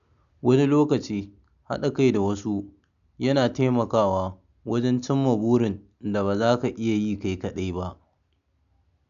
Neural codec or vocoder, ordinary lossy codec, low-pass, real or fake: none; none; 7.2 kHz; real